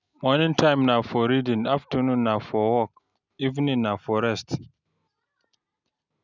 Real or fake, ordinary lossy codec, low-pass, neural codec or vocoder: real; none; 7.2 kHz; none